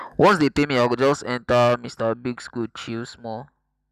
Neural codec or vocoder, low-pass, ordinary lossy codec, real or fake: none; 14.4 kHz; none; real